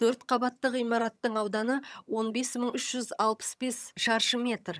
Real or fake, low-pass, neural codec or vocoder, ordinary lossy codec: fake; none; vocoder, 22.05 kHz, 80 mel bands, HiFi-GAN; none